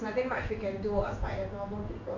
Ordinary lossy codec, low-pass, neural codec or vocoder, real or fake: none; 7.2 kHz; codec, 16 kHz in and 24 kHz out, 1 kbps, XY-Tokenizer; fake